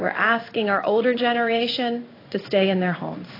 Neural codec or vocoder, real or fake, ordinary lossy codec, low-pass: codec, 16 kHz in and 24 kHz out, 1 kbps, XY-Tokenizer; fake; AAC, 24 kbps; 5.4 kHz